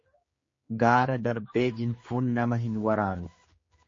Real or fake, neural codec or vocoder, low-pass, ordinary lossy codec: fake; codec, 16 kHz, 2 kbps, X-Codec, HuBERT features, trained on general audio; 7.2 kHz; MP3, 32 kbps